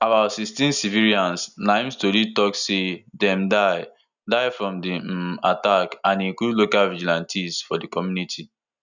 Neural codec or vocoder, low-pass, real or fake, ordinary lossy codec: none; 7.2 kHz; real; none